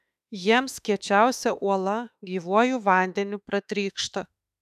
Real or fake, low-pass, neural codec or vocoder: fake; 14.4 kHz; autoencoder, 48 kHz, 32 numbers a frame, DAC-VAE, trained on Japanese speech